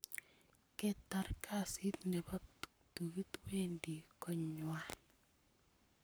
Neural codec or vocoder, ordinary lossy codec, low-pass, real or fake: codec, 44.1 kHz, 7.8 kbps, Pupu-Codec; none; none; fake